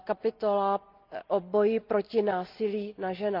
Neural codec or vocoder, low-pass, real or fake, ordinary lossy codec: none; 5.4 kHz; real; Opus, 24 kbps